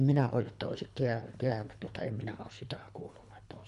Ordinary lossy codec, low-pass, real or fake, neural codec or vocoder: MP3, 96 kbps; 10.8 kHz; fake; codec, 24 kHz, 3 kbps, HILCodec